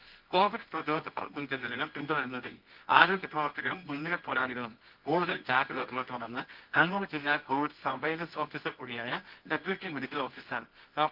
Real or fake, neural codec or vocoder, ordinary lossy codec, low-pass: fake; codec, 24 kHz, 0.9 kbps, WavTokenizer, medium music audio release; Opus, 24 kbps; 5.4 kHz